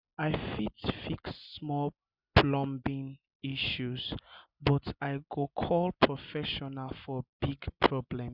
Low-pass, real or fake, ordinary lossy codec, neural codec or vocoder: 5.4 kHz; real; Opus, 64 kbps; none